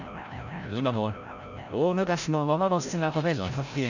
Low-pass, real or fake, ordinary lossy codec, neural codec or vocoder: 7.2 kHz; fake; none; codec, 16 kHz, 0.5 kbps, FreqCodec, larger model